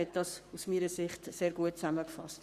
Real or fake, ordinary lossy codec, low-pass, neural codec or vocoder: fake; Opus, 64 kbps; 14.4 kHz; codec, 44.1 kHz, 7.8 kbps, Pupu-Codec